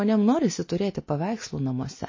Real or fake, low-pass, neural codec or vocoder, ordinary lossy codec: real; 7.2 kHz; none; MP3, 32 kbps